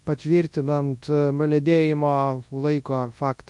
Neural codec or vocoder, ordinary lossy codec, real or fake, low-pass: codec, 24 kHz, 0.9 kbps, WavTokenizer, large speech release; MP3, 48 kbps; fake; 10.8 kHz